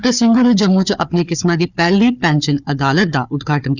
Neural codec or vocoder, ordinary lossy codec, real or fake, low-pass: codec, 16 kHz, 4 kbps, FunCodec, trained on Chinese and English, 50 frames a second; none; fake; 7.2 kHz